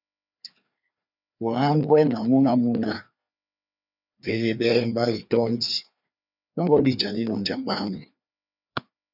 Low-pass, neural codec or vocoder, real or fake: 5.4 kHz; codec, 16 kHz, 2 kbps, FreqCodec, larger model; fake